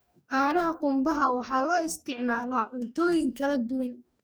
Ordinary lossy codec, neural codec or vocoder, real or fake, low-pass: none; codec, 44.1 kHz, 2.6 kbps, DAC; fake; none